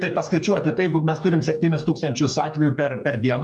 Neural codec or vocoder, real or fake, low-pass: codec, 44.1 kHz, 2.6 kbps, DAC; fake; 10.8 kHz